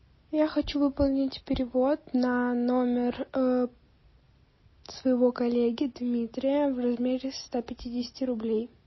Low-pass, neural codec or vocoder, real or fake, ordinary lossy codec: 7.2 kHz; none; real; MP3, 24 kbps